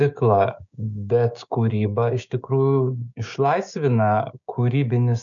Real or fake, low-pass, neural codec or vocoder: fake; 7.2 kHz; codec, 16 kHz, 6 kbps, DAC